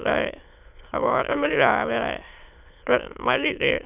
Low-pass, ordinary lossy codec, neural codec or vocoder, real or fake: 3.6 kHz; none; autoencoder, 22.05 kHz, a latent of 192 numbers a frame, VITS, trained on many speakers; fake